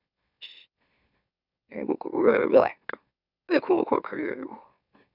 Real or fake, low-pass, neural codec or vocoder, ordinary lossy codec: fake; 5.4 kHz; autoencoder, 44.1 kHz, a latent of 192 numbers a frame, MeloTTS; none